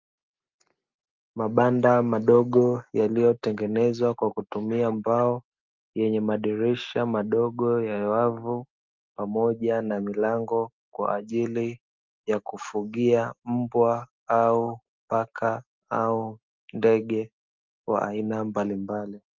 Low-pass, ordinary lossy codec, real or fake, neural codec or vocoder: 7.2 kHz; Opus, 24 kbps; real; none